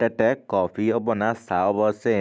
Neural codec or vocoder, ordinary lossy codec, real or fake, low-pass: none; none; real; none